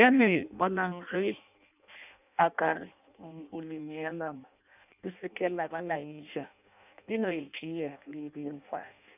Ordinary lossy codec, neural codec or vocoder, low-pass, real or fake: none; codec, 16 kHz in and 24 kHz out, 0.6 kbps, FireRedTTS-2 codec; 3.6 kHz; fake